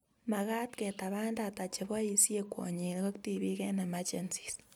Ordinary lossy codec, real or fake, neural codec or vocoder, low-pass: none; real; none; none